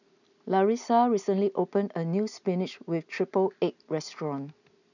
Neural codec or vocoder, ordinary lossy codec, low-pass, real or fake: none; none; 7.2 kHz; real